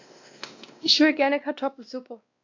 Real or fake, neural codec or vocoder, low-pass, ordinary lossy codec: fake; codec, 16 kHz, 1 kbps, X-Codec, WavLM features, trained on Multilingual LibriSpeech; 7.2 kHz; AAC, 48 kbps